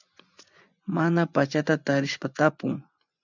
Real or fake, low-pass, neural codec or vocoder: fake; 7.2 kHz; vocoder, 24 kHz, 100 mel bands, Vocos